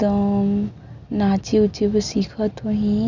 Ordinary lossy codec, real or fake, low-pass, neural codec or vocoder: none; real; 7.2 kHz; none